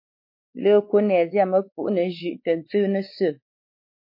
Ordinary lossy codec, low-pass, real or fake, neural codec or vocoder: MP3, 32 kbps; 5.4 kHz; fake; codec, 16 kHz, 4 kbps, X-Codec, WavLM features, trained on Multilingual LibriSpeech